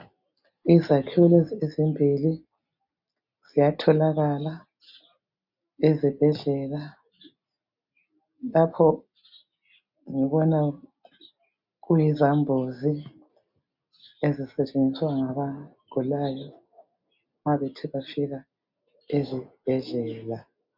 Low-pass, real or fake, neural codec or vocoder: 5.4 kHz; real; none